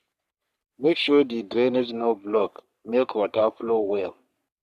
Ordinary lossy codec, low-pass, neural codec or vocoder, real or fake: none; 14.4 kHz; codec, 44.1 kHz, 3.4 kbps, Pupu-Codec; fake